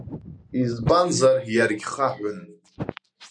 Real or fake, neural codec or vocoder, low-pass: real; none; 9.9 kHz